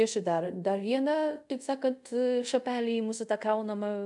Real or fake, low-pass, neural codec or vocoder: fake; 10.8 kHz; codec, 24 kHz, 0.5 kbps, DualCodec